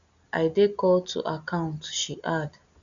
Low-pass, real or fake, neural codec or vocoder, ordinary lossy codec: 7.2 kHz; real; none; none